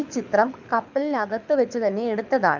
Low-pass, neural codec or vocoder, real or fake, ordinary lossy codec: 7.2 kHz; codec, 24 kHz, 6 kbps, HILCodec; fake; none